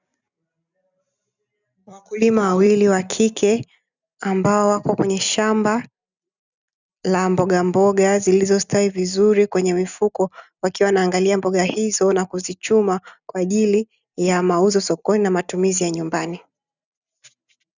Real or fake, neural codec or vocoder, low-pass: real; none; 7.2 kHz